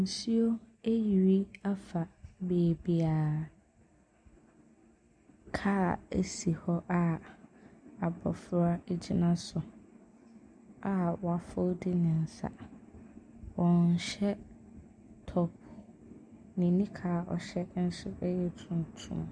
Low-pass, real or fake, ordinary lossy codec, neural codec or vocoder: 9.9 kHz; real; MP3, 96 kbps; none